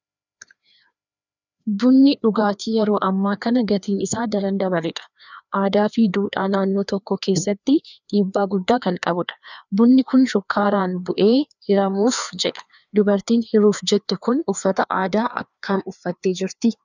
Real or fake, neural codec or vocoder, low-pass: fake; codec, 16 kHz, 2 kbps, FreqCodec, larger model; 7.2 kHz